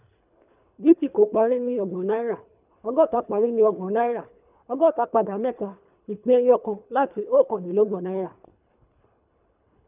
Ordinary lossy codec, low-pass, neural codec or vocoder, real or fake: none; 3.6 kHz; codec, 24 kHz, 3 kbps, HILCodec; fake